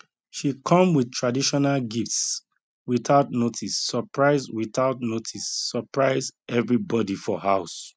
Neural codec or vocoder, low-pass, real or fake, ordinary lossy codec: none; none; real; none